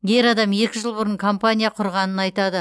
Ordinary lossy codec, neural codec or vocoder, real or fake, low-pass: none; none; real; none